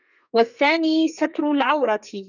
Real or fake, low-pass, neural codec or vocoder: fake; 7.2 kHz; codec, 32 kHz, 1.9 kbps, SNAC